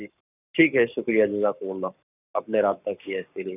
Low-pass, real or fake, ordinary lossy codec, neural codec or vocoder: 3.6 kHz; fake; none; codec, 44.1 kHz, 7.8 kbps, Pupu-Codec